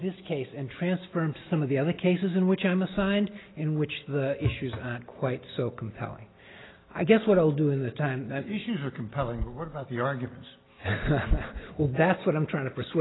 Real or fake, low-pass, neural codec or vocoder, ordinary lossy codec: real; 7.2 kHz; none; AAC, 16 kbps